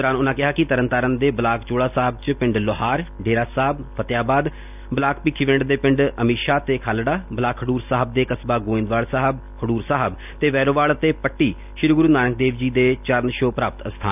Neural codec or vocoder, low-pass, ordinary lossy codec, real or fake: none; 3.6 kHz; none; real